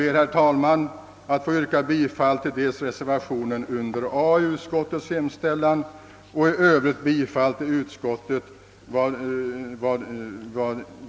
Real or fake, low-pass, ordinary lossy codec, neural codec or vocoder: real; none; none; none